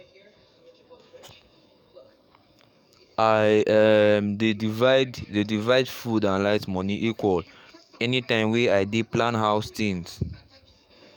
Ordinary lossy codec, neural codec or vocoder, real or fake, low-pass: none; codec, 44.1 kHz, 7.8 kbps, DAC; fake; 19.8 kHz